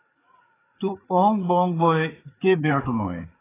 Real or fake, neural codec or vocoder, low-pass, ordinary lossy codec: fake; codec, 16 kHz, 4 kbps, FreqCodec, larger model; 3.6 kHz; AAC, 16 kbps